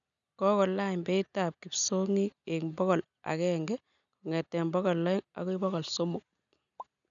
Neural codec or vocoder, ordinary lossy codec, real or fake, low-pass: none; none; real; 7.2 kHz